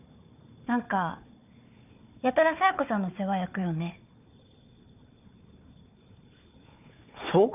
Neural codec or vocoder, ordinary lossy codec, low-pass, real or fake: codec, 16 kHz, 4 kbps, FunCodec, trained on Chinese and English, 50 frames a second; none; 3.6 kHz; fake